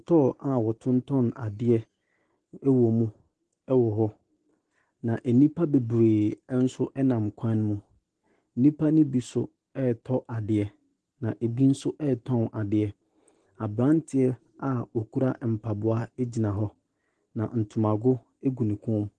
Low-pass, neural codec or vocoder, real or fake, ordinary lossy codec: 10.8 kHz; none; real; Opus, 16 kbps